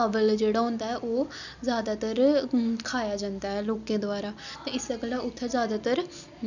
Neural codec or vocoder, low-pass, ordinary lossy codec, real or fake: none; 7.2 kHz; none; real